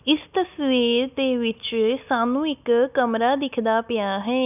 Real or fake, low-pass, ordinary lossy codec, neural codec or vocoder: real; 3.6 kHz; none; none